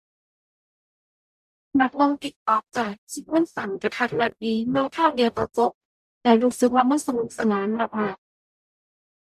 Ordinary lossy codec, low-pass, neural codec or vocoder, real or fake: none; 14.4 kHz; codec, 44.1 kHz, 0.9 kbps, DAC; fake